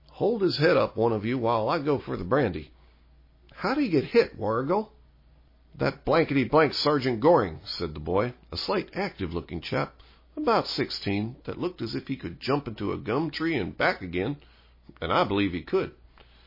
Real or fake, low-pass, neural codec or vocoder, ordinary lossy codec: real; 5.4 kHz; none; MP3, 24 kbps